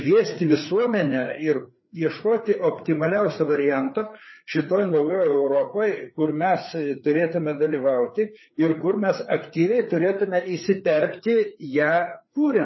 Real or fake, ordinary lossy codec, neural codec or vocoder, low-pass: fake; MP3, 24 kbps; codec, 16 kHz, 4 kbps, FreqCodec, larger model; 7.2 kHz